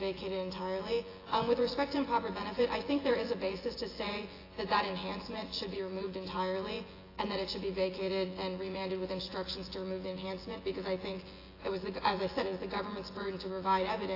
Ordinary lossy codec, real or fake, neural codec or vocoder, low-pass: AAC, 24 kbps; fake; vocoder, 24 kHz, 100 mel bands, Vocos; 5.4 kHz